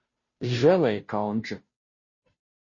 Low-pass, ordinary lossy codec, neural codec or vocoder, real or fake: 7.2 kHz; MP3, 32 kbps; codec, 16 kHz, 0.5 kbps, FunCodec, trained on Chinese and English, 25 frames a second; fake